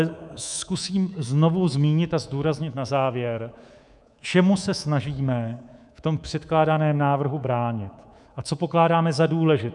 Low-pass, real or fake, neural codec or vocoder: 10.8 kHz; fake; codec, 24 kHz, 3.1 kbps, DualCodec